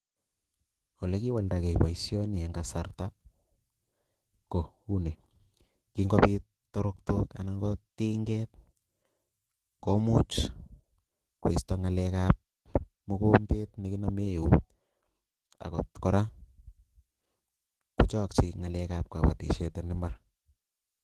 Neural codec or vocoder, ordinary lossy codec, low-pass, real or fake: autoencoder, 48 kHz, 128 numbers a frame, DAC-VAE, trained on Japanese speech; Opus, 16 kbps; 14.4 kHz; fake